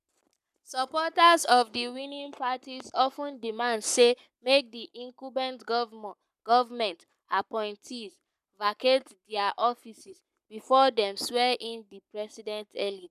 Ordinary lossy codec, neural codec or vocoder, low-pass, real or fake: none; codec, 44.1 kHz, 7.8 kbps, Pupu-Codec; 14.4 kHz; fake